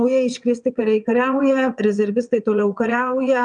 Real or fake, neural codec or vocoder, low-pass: fake; vocoder, 22.05 kHz, 80 mel bands, WaveNeXt; 9.9 kHz